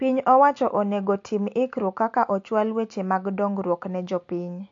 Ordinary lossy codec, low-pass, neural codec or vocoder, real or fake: AAC, 48 kbps; 7.2 kHz; none; real